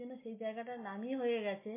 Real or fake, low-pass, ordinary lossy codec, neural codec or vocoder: real; 3.6 kHz; MP3, 24 kbps; none